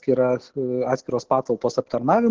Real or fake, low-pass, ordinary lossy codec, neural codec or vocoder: real; 7.2 kHz; Opus, 16 kbps; none